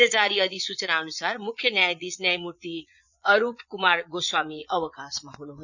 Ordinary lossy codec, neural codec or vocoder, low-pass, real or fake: none; vocoder, 22.05 kHz, 80 mel bands, Vocos; 7.2 kHz; fake